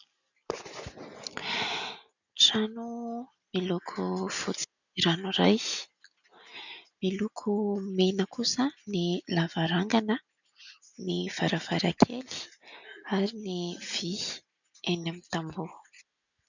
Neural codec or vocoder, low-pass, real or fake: none; 7.2 kHz; real